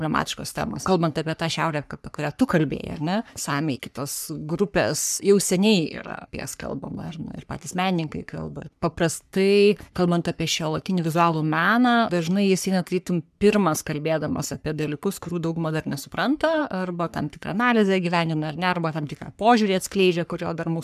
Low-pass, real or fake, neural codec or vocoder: 14.4 kHz; fake; codec, 44.1 kHz, 3.4 kbps, Pupu-Codec